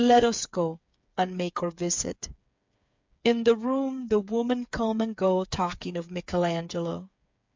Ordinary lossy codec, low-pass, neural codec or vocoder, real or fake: MP3, 64 kbps; 7.2 kHz; codec, 16 kHz, 8 kbps, FreqCodec, smaller model; fake